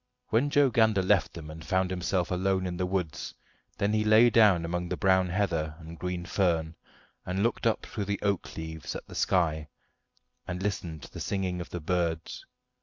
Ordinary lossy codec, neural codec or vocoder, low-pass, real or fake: MP3, 64 kbps; none; 7.2 kHz; real